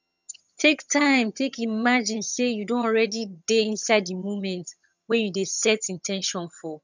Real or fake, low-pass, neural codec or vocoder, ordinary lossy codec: fake; 7.2 kHz; vocoder, 22.05 kHz, 80 mel bands, HiFi-GAN; none